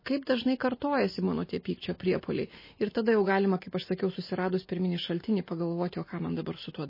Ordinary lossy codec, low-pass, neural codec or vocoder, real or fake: MP3, 24 kbps; 5.4 kHz; none; real